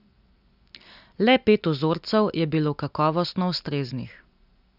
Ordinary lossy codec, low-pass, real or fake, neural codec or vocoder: none; 5.4 kHz; real; none